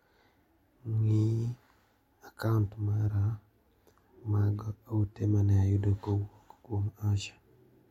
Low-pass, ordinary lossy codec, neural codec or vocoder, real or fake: 19.8 kHz; MP3, 64 kbps; none; real